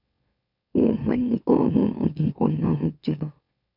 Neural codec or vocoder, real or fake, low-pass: autoencoder, 44.1 kHz, a latent of 192 numbers a frame, MeloTTS; fake; 5.4 kHz